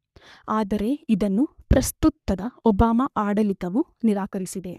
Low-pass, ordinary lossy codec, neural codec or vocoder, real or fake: 14.4 kHz; none; codec, 44.1 kHz, 3.4 kbps, Pupu-Codec; fake